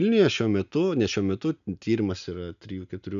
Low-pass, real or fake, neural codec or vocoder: 7.2 kHz; real; none